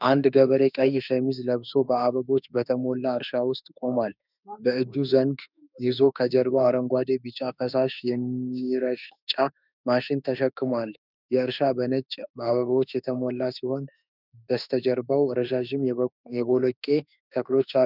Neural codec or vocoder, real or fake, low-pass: autoencoder, 48 kHz, 32 numbers a frame, DAC-VAE, trained on Japanese speech; fake; 5.4 kHz